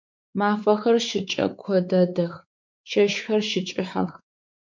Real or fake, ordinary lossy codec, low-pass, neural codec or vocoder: fake; MP3, 64 kbps; 7.2 kHz; codec, 16 kHz, 4 kbps, X-Codec, WavLM features, trained on Multilingual LibriSpeech